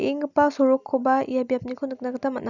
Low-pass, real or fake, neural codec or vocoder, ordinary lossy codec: 7.2 kHz; real; none; none